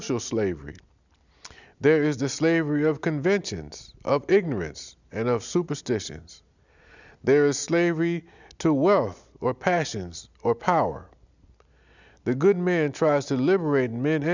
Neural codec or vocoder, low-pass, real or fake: none; 7.2 kHz; real